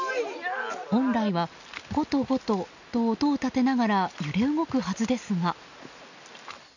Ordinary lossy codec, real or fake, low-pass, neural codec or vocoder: none; real; 7.2 kHz; none